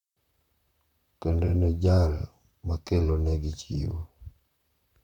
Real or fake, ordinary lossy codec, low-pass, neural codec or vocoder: fake; none; 19.8 kHz; vocoder, 44.1 kHz, 128 mel bands, Pupu-Vocoder